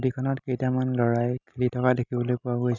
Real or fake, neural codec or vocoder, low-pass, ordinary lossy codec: real; none; 7.2 kHz; none